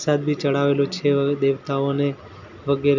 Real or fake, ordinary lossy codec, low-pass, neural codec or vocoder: real; none; 7.2 kHz; none